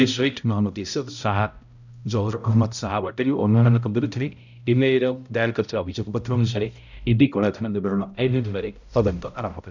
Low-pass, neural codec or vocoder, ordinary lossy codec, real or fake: 7.2 kHz; codec, 16 kHz, 0.5 kbps, X-Codec, HuBERT features, trained on balanced general audio; none; fake